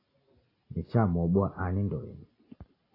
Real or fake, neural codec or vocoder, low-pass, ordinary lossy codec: real; none; 5.4 kHz; AAC, 24 kbps